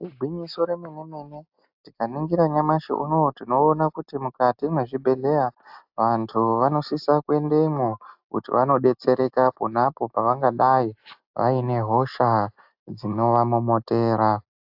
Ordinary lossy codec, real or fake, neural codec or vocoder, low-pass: AAC, 48 kbps; real; none; 5.4 kHz